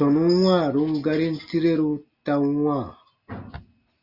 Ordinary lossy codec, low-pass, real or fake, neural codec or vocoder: Opus, 64 kbps; 5.4 kHz; real; none